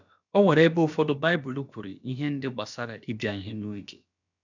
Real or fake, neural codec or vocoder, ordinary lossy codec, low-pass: fake; codec, 16 kHz, about 1 kbps, DyCAST, with the encoder's durations; none; 7.2 kHz